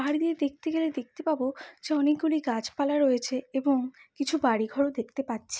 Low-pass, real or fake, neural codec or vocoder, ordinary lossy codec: none; real; none; none